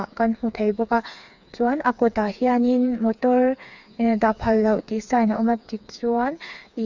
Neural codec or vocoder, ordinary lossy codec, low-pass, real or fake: codec, 16 kHz, 4 kbps, FreqCodec, smaller model; none; 7.2 kHz; fake